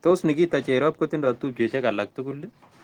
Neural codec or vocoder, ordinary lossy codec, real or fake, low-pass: vocoder, 44.1 kHz, 128 mel bands every 512 samples, BigVGAN v2; Opus, 16 kbps; fake; 19.8 kHz